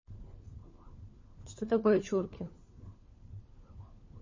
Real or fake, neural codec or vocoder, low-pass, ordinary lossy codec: fake; codec, 16 kHz, 4 kbps, FunCodec, trained on LibriTTS, 50 frames a second; 7.2 kHz; MP3, 32 kbps